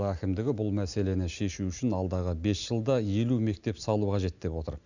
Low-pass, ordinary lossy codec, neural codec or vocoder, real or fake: 7.2 kHz; none; none; real